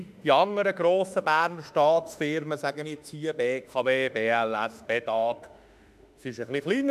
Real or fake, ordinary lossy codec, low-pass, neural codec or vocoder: fake; none; 14.4 kHz; autoencoder, 48 kHz, 32 numbers a frame, DAC-VAE, trained on Japanese speech